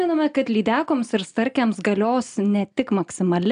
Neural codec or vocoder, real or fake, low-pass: none; real; 9.9 kHz